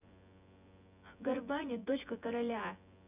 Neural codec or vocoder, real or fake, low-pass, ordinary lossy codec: vocoder, 24 kHz, 100 mel bands, Vocos; fake; 3.6 kHz; none